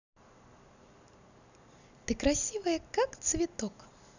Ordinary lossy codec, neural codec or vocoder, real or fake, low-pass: none; none; real; 7.2 kHz